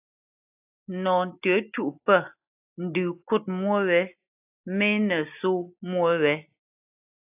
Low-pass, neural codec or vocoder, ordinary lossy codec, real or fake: 3.6 kHz; none; AAC, 32 kbps; real